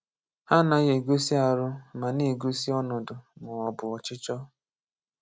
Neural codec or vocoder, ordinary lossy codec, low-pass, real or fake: none; none; none; real